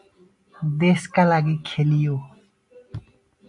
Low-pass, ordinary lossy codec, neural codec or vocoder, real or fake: 10.8 kHz; AAC, 64 kbps; none; real